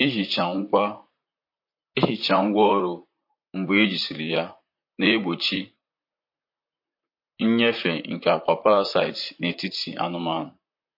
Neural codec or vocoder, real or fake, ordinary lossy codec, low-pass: vocoder, 44.1 kHz, 128 mel bands, Pupu-Vocoder; fake; MP3, 32 kbps; 5.4 kHz